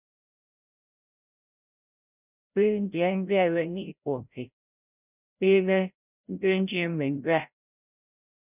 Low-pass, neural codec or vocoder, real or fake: 3.6 kHz; codec, 16 kHz, 0.5 kbps, FreqCodec, larger model; fake